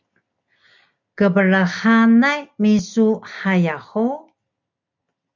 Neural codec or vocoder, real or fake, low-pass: none; real; 7.2 kHz